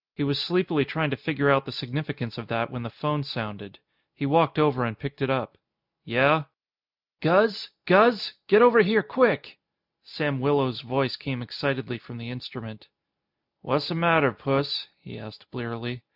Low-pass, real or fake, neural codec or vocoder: 5.4 kHz; real; none